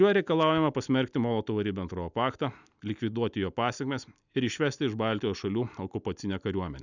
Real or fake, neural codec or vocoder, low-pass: real; none; 7.2 kHz